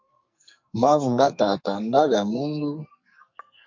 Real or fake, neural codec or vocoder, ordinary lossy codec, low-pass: fake; codec, 44.1 kHz, 2.6 kbps, SNAC; MP3, 48 kbps; 7.2 kHz